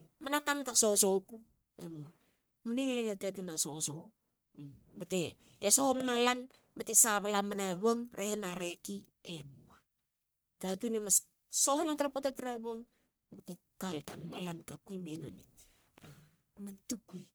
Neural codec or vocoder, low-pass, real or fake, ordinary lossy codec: codec, 44.1 kHz, 1.7 kbps, Pupu-Codec; none; fake; none